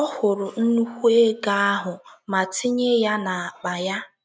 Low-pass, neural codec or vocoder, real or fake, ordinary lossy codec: none; none; real; none